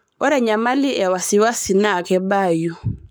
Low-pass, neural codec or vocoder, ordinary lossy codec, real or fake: none; codec, 44.1 kHz, 7.8 kbps, Pupu-Codec; none; fake